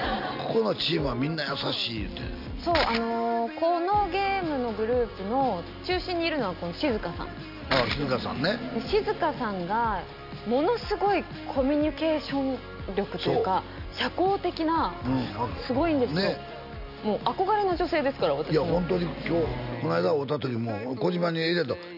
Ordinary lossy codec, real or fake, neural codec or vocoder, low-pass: none; real; none; 5.4 kHz